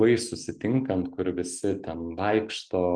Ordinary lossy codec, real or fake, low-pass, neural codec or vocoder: Opus, 32 kbps; real; 9.9 kHz; none